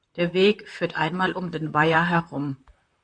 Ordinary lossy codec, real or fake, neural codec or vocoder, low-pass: MP3, 96 kbps; fake; vocoder, 44.1 kHz, 128 mel bands, Pupu-Vocoder; 9.9 kHz